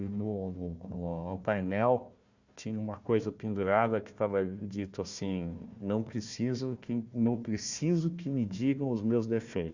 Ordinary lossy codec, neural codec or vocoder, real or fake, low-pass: Opus, 64 kbps; codec, 16 kHz, 1 kbps, FunCodec, trained on Chinese and English, 50 frames a second; fake; 7.2 kHz